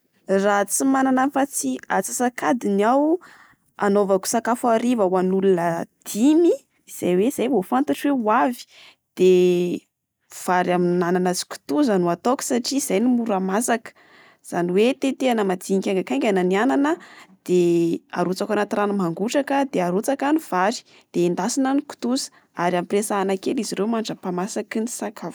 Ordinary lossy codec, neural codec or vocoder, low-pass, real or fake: none; none; none; real